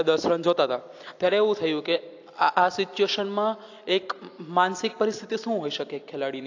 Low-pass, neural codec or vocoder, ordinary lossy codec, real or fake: 7.2 kHz; none; AAC, 48 kbps; real